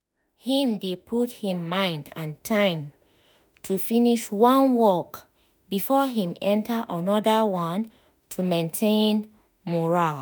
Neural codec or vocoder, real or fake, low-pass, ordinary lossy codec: autoencoder, 48 kHz, 32 numbers a frame, DAC-VAE, trained on Japanese speech; fake; none; none